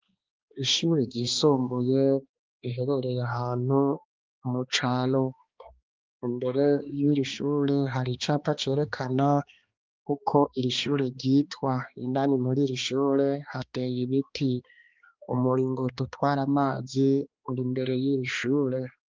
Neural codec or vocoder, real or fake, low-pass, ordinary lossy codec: codec, 16 kHz, 2 kbps, X-Codec, HuBERT features, trained on balanced general audio; fake; 7.2 kHz; Opus, 32 kbps